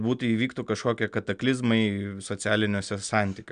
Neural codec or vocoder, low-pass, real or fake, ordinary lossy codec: none; 9.9 kHz; real; MP3, 96 kbps